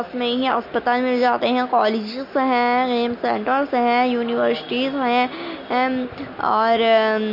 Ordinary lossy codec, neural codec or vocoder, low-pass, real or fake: MP3, 32 kbps; none; 5.4 kHz; real